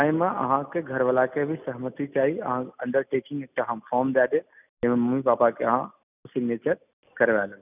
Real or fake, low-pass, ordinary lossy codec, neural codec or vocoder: real; 3.6 kHz; none; none